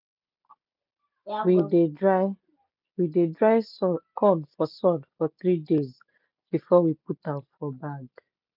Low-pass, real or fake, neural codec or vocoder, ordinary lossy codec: 5.4 kHz; real; none; none